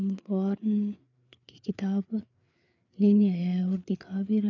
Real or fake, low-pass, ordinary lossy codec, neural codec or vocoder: fake; 7.2 kHz; none; codec, 16 kHz, 4 kbps, FreqCodec, larger model